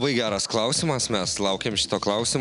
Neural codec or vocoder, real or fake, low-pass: none; real; 10.8 kHz